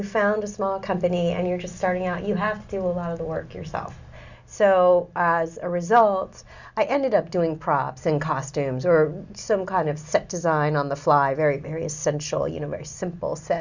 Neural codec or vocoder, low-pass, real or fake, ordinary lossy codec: none; 7.2 kHz; real; Opus, 64 kbps